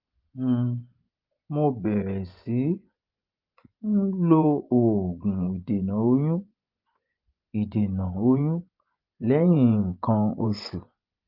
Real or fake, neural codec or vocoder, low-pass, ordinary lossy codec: fake; vocoder, 24 kHz, 100 mel bands, Vocos; 5.4 kHz; Opus, 32 kbps